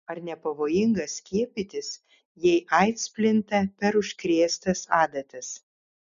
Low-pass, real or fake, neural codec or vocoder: 7.2 kHz; real; none